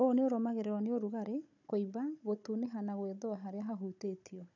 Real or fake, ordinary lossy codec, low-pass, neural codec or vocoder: fake; none; 7.2 kHz; codec, 16 kHz, 16 kbps, FunCodec, trained on Chinese and English, 50 frames a second